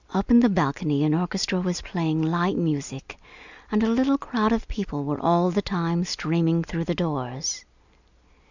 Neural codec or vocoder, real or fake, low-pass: none; real; 7.2 kHz